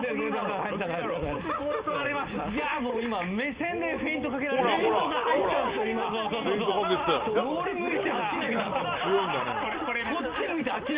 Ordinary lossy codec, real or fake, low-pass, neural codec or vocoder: Opus, 32 kbps; real; 3.6 kHz; none